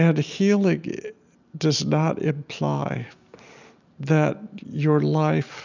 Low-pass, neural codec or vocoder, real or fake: 7.2 kHz; none; real